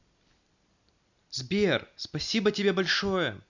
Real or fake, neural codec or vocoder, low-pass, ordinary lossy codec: real; none; 7.2 kHz; Opus, 64 kbps